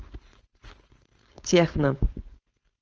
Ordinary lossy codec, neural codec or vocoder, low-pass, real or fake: Opus, 24 kbps; codec, 16 kHz, 4.8 kbps, FACodec; 7.2 kHz; fake